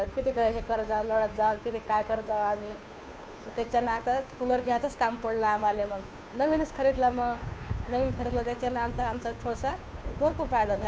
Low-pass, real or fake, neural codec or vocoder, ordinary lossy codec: none; fake; codec, 16 kHz, 2 kbps, FunCodec, trained on Chinese and English, 25 frames a second; none